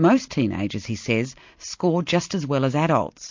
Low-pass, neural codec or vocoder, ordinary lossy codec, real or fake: 7.2 kHz; none; MP3, 48 kbps; real